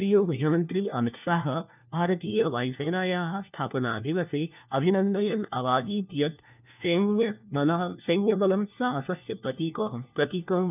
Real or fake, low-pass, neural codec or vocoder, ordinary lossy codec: fake; 3.6 kHz; codec, 16 kHz, 1 kbps, FunCodec, trained on LibriTTS, 50 frames a second; none